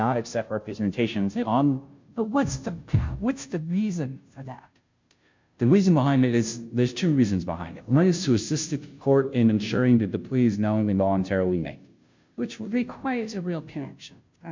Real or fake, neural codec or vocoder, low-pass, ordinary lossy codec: fake; codec, 16 kHz, 0.5 kbps, FunCodec, trained on Chinese and English, 25 frames a second; 7.2 kHz; MP3, 64 kbps